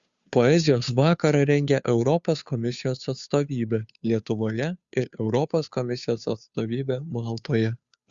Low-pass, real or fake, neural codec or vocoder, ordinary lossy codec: 7.2 kHz; fake; codec, 16 kHz, 2 kbps, FunCodec, trained on Chinese and English, 25 frames a second; Opus, 64 kbps